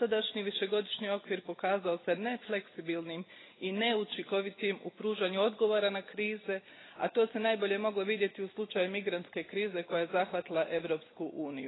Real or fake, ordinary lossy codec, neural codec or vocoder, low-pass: real; AAC, 16 kbps; none; 7.2 kHz